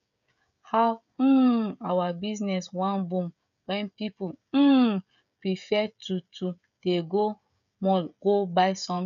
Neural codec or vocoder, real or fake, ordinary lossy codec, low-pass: codec, 16 kHz, 16 kbps, FreqCodec, smaller model; fake; none; 7.2 kHz